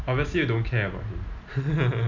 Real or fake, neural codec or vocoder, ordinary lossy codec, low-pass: real; none; none; 7.2 kHz